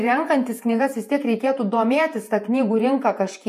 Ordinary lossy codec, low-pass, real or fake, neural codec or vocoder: MP3, 64 kbps; 14.4 kHz; fake; vocoder, 48 kHz, 128 mel bands, Vocos